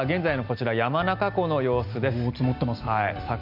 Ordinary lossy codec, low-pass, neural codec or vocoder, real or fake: none; 5.4 kHz; none; real